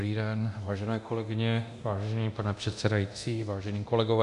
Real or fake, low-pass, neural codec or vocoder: fake; 10.8 kHz; codec, 24 kHz, 0.9 kbps, DualCodec